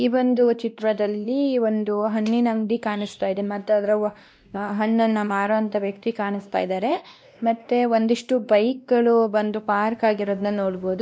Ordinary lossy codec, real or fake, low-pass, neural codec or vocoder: none; fake; none; codec, 16 kHz, 1 kbps, X-Codec, WavLM features, trained on Multilingual LibriSpeech